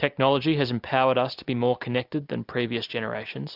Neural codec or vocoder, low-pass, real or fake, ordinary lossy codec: none; 5.4 kHz; real; AAC, 48 kbps